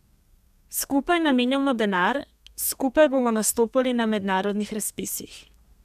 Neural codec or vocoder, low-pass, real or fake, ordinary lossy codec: codec, 32 kHz, 1.9 kbps, SNAC; 14.4 kHz; fake; none